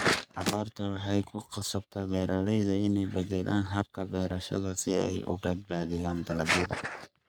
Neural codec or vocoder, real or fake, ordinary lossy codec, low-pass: codec, 44.1 kHz, 3.4 kbps, Pupu-Codec; fake; none; none